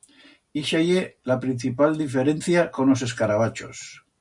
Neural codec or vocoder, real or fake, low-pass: none; real; 10.8 kHz